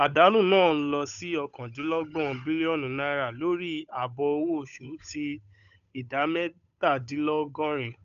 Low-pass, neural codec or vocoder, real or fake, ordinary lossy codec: 7.2 kHz; codec, 16 kHz, 8 kbps, FunCodec, trained on Chinese and English, 25 frames a second; fake; none